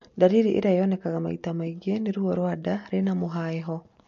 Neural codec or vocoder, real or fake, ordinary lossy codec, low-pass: none; real; MP3, 48 kbps; 7.2 kHz